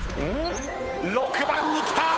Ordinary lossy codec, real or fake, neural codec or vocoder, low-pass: none; fake; codec, 16 kHz, 8 kbps, FunCodec, trained on Chinese and English, 25 frames a second; none